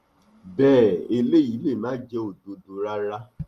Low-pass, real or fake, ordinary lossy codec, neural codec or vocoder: 14.4 kHz; real; Opus, 32 kbps; none